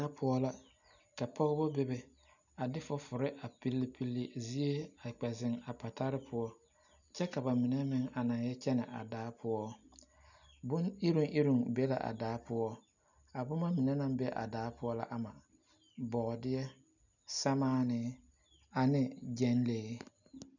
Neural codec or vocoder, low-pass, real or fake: none; 7.2 kHz; real